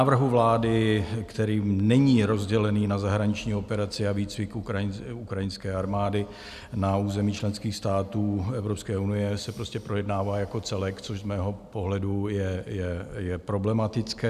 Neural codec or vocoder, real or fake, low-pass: none; real; 14.4 kHz